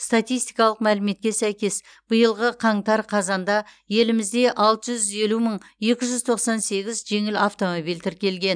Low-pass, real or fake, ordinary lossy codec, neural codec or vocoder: 9.9 kHz; real; none; none